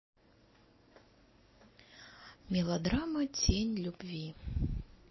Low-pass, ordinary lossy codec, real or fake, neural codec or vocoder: 7.2 kHz; MP3, 24 kbps; real; none